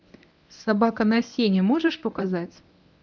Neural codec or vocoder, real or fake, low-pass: codec, 24 kHz, 0.9 kbps, WavTokenizer, medium speech release version 1; fake; 7.2 kHz